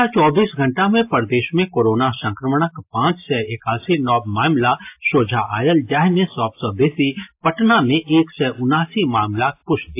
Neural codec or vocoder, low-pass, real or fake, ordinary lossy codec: none; 3.6 kHz; real; AAC, 32 kbps